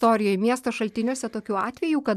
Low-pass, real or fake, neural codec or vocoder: 14.4 kHz; real; none